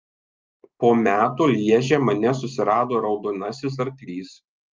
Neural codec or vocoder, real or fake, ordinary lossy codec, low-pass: none; real; Opus, 24 kbps; 7.2 kHz